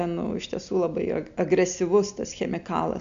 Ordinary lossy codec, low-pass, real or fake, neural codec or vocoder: AAC, 64 kbps; 7.2 kHz; real; none